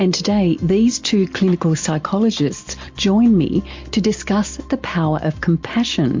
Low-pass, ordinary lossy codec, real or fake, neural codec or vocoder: 7.2 kHz; MP3, 48 kbps; real; none